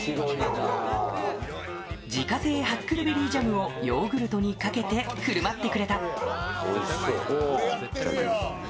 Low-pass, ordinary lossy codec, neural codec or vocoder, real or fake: none; none; none; real